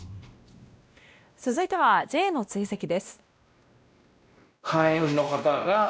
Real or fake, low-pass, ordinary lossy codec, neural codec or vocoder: fake; none; none; codec, 16 kHz, 1 kbps, X-Codec, WavLM features, trained on Multilingual LibriSpeech